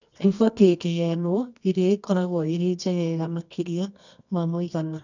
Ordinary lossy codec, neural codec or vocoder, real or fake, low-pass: none; codec, 24 kHz, 0.9 kbps, WavTokenizer, medium music audio release; fake; 7.2 kHz